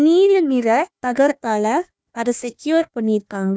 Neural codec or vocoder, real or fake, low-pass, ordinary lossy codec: codec, 16 kHz, 1 kbps, FunCodec, trained on Chinese and English, 50 frames a second; fake; none; none